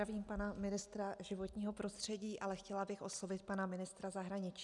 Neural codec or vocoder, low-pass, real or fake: none; 10.8 kHz; real